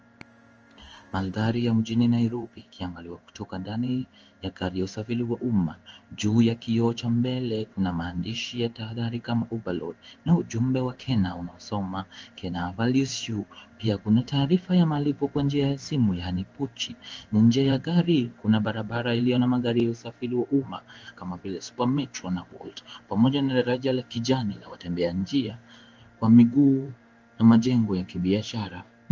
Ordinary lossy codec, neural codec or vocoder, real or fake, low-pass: Opus, 24 kbps; codec, 16 kHz in and 24 kHz out, 1 kbps, XY-Tokenizer; fake; 7.2 kHz